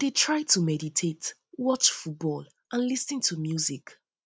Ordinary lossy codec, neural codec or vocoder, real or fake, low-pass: none; none; real; none